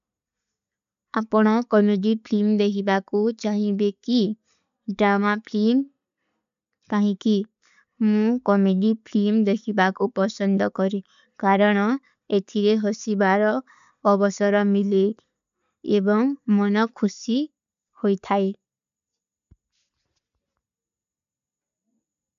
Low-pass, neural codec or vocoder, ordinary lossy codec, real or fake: 7.2 kHz; none; none; real